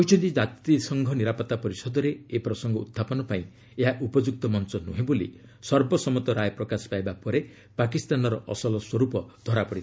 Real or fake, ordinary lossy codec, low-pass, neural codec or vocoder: real; none; none; none